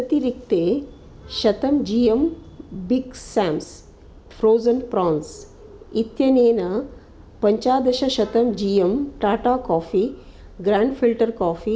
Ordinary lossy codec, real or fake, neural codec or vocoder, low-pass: none; real; none; none